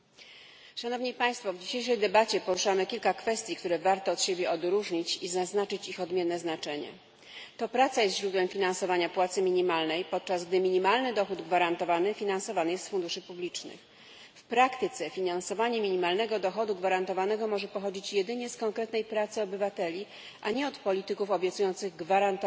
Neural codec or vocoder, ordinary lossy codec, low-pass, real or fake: none; none; none; real